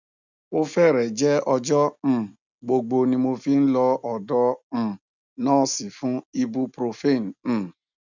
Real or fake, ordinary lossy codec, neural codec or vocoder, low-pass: real; none; none; 7.2 kHz